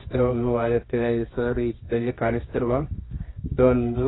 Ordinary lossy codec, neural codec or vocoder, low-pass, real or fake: AAC, 16 kbps; codec, 24 kHz, 0.9 kbps, WavTokenizer, medium music audio release; 7.2 kHz; fake